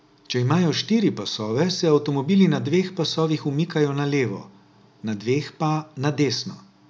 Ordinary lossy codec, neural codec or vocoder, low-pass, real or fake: none; none; none; real